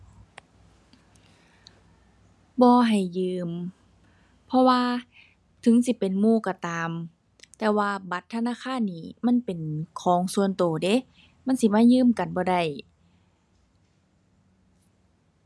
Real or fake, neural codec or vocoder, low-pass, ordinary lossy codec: real; none; none; none